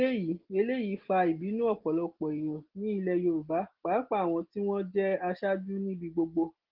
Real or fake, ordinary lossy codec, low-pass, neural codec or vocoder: real; Opus, 16 kbps; 5.4 kHz; none